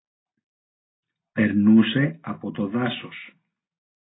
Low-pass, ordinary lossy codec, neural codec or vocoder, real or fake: 7.2 kHz; AAC, 16 kbps; none; real